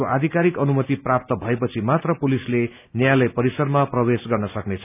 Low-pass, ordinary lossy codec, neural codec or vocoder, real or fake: 3.6 kHz; none; none; real